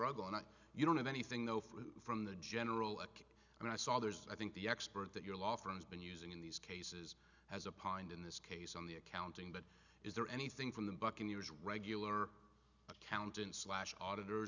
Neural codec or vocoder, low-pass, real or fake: none; 7.2 kHz; real